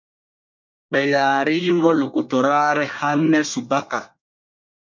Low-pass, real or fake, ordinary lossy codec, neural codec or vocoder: 7.2 kHz; fake; MP3, 48 kbps; codec, 24 kHz, 1 kbps, SNAC